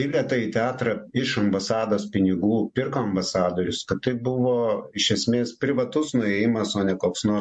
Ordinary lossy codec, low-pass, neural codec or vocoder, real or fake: MP3, 48 kbps; 10.8 kHz; none; real